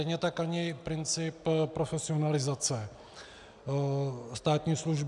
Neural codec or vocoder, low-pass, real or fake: none; 10.8 kHz; real